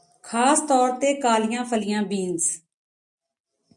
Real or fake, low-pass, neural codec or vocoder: real; 10.8 kHz; none